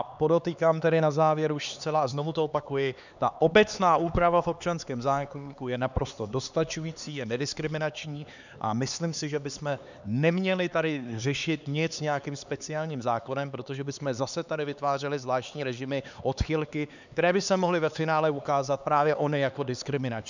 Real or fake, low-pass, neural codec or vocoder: fake; 7.2 kHz; codec, 16 kHz, 4 kbps, X-Codec, HuBERT features, trained on LibriSpeech